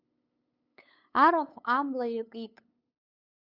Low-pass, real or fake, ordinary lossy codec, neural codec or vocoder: 5.4 kHz; fake; Opus, 64 kbps; codec, 16 kHz, 8 kbps, FunCodec, trained on LibriTTS, 25 frames a second